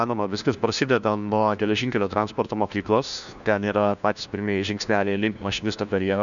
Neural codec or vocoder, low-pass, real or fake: codec, 16 kHz, 1 kbps, FunCodec, trained on LibriTTS, 50 frames a second; 7.2 kHz; fake